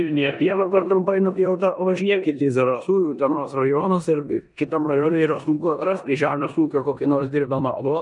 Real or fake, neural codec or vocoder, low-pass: fake; codec, 16 kHz in and 24 kHz out, 0.9 kbps, LongCat-Audio-Codec, four codebook decoder; 10.8 kHz